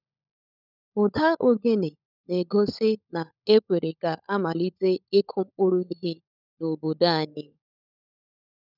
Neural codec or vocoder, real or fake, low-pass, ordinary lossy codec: codec, 16 kHz, 16 kbps, FunCodec, trained on LibriTTS, 50 frames a second; fake; 5.4 kHz; none